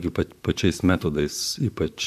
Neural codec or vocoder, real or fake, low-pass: vocoder, 44.1 kHz, 128 mel bands, Pupu-Vocoder; fake; 14.4 kHz